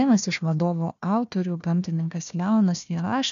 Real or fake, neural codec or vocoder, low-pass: fake; codec, 16 kHz, 1 kbps, FunCodec, trained on Chinese and English, 50 frames a second; 7.2 kHz